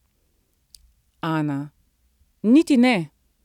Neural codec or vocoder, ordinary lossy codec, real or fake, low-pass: none; none; real; 19.8 kHz